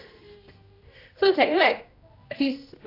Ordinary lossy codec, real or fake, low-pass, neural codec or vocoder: AAC, 32 kbps; fake; 5.4 kHz; codec, 16 kHz, 1 kbps, X-Codec, HuBERT features, trained on general audio